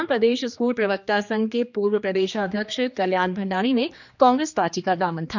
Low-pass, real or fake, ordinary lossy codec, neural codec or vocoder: 7.2 kHz; fake; none; codec, 16 kHz, 2 kbps, X-Codec, HuBERT features, trained on general audio